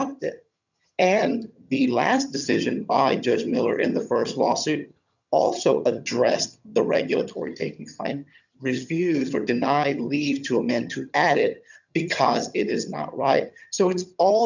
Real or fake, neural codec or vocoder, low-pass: fake; vocoder, 22.05 kHz, 80 mel bands, HiFi-GAN; 7.2 kHz